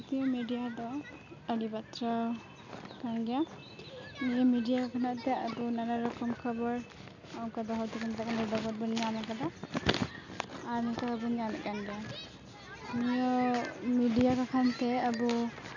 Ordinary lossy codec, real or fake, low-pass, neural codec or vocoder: none; real; 7.2 kHz; none